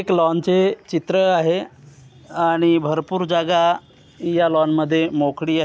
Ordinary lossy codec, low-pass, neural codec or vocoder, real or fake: none; none; none; real